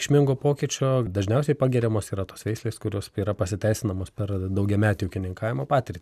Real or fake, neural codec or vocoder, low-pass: real; none; 14.4 kHz